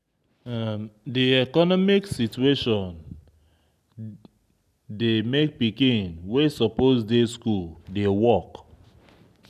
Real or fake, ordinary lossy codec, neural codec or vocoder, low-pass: real; none; none; 14.4 kHz